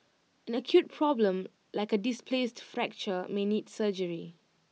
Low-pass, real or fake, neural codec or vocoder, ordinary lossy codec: none; real; none; none